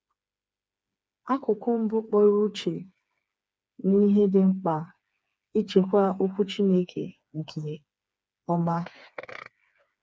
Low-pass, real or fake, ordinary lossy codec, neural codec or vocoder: none; fake; none; codec, 16 kHz, 4 kbps, FreqCodec, smaller model